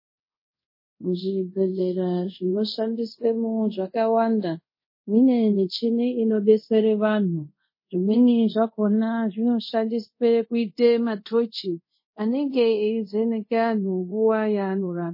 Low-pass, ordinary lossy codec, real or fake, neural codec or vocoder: 5.4 kHz; MP3, 24 kbps; fake; codec, 24 kHz, 0.5 kbps, DualCodec